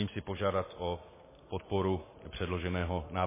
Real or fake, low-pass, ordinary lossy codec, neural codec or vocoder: real; 3.6 kHz; MP3, 16 kbps; none